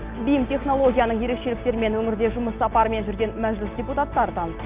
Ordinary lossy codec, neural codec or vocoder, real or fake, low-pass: Opus, 24 kbps; none; real; 3.6 kHz